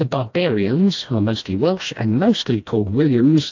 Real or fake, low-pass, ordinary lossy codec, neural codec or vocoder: fake; 7.2 kHz; AAC, 48 kbps; codec, 16 kHz, 1 kbps, FreqCodec, smaller model